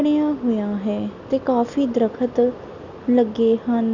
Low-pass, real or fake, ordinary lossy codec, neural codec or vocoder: 7.2 kHz; real; none; none